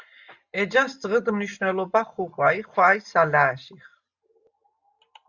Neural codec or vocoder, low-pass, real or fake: none; 7.2 kHz; real